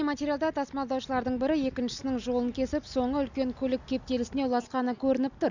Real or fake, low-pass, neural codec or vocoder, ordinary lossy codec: real; 7.2 kHz; none; none